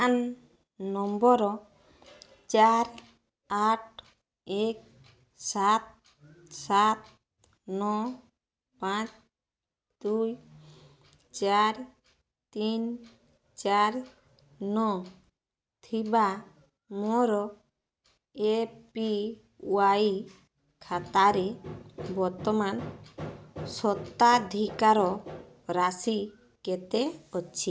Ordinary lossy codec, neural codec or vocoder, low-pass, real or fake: none; none; none; real